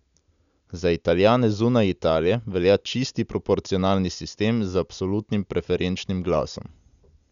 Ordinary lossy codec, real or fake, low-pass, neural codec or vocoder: none; real; 7.2 kHz; none